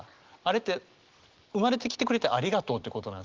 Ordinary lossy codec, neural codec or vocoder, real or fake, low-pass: Opus, 16 kbps; none; real; 7.2 kHz